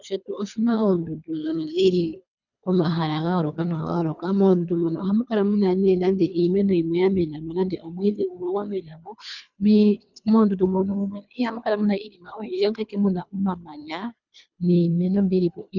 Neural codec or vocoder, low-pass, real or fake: codec, 24 kHz, 3 kbps, HILCodec; 7.2 kHz; fake